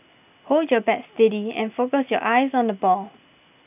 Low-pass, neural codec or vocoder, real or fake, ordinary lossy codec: 3.6 kHz; none; real; none